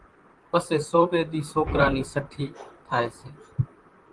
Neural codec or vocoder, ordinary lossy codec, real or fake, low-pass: vocoder, 44.1 kHz, 128 mel bands, Pupu-Vocoder; Opus, 24 kbps; fake; 10.8 kHz